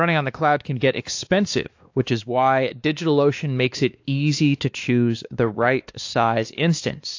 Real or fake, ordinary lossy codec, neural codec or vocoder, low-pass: fake; AAC, 48 kbps; codec, 16 kHz, 2 kbps, X-Codec, WavLM features, trained on Multilingual LibriSpeech; 7.2 kHz